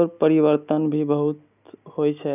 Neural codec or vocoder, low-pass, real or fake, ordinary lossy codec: none; 3.6 kHz; real; none